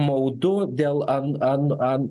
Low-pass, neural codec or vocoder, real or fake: 10.8 kHz; none; real